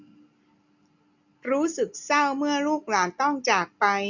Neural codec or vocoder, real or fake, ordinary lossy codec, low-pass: none; real; none; 7.2 kHz